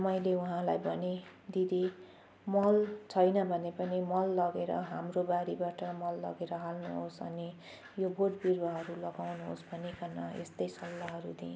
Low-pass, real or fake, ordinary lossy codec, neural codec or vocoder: none; real; none; none